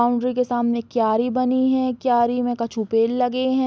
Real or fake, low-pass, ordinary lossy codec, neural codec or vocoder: real; none; none; none